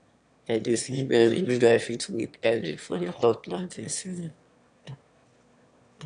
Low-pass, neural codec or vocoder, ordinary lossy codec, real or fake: 9.9 kHz; autoencoder, 22.05 kHz, a latent of 192 numbers a frame, VITS, trained on one speaker; none; fake